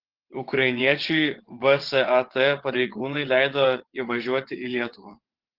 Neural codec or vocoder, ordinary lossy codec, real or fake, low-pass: vocoder, 22.05 kHz, 80 mel bands, WaveNeXt; Opus, 16 kbps; fake; 5.4 kHz